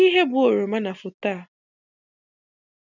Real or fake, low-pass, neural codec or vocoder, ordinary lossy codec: real; 7.2 kHz; none; none